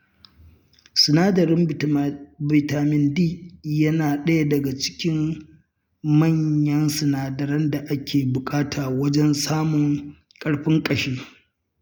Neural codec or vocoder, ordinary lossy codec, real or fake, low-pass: none; none; real; none